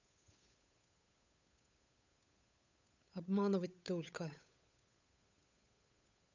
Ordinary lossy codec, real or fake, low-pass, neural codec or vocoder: none; fake; 7.2 kHz; codec, 16 kHz, 8 kbps, FunCodec, trained on Chinese and English, 25 frames a second